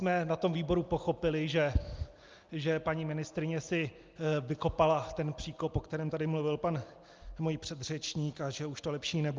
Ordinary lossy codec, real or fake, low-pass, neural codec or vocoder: Opus, 24 kbps; real; 7.2 kHz; none